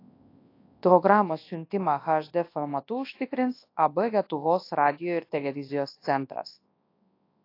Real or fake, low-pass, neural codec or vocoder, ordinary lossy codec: fake; 5.4 kHz; codec, 24 kHz, 0.9 kbps, WavTokenizer, large speech release; AAC, 32 kbps